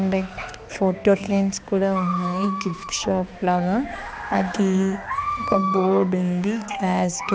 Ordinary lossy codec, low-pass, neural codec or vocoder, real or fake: none; none; codec, 16 kHz, 2 kbps, X-Codec, HuBERT features, trained on balanced general audio; fake